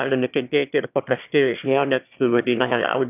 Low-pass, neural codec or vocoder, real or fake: 3.6 kHz; autoencoder, 22.05 kHz, a latent of 192 numbers a frame, VITS, trained on one speaker; fake